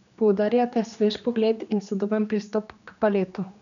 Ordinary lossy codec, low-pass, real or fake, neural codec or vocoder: none; 7.2 kHz; fake; codec, 16 kHz, 2 kbps, X-Codec, HuBERT features, trained on general audio